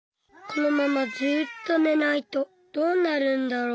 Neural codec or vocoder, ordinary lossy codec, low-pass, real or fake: none; none; none; real